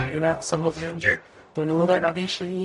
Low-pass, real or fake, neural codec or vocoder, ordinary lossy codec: 14.4 kHz; fake; codec, 44.1 kHz, 0.9 kbps, DAC; MP3, 48 kbps